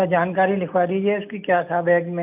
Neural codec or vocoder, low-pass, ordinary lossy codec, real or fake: none; 3.6 kHz; none; real